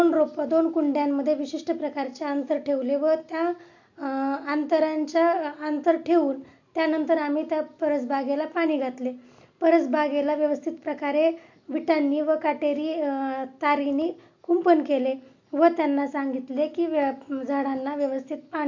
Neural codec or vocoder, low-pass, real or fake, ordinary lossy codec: none; 7.2 kHz; real; MP3, 48 kbps